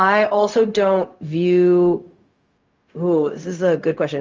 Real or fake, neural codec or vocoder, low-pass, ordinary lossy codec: fake; codec, 16 kHz, 0.4 kbps, LongCat-Audio-Codec; 7.2 kHz; Opus, 32 kbps